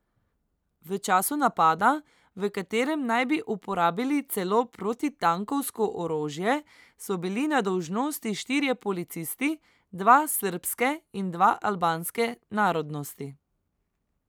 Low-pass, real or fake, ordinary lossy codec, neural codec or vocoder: none; real; none; none